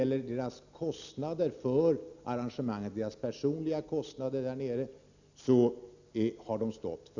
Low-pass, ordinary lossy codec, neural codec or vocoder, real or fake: 7.2 kHz; Opus, 64 kbps; none; real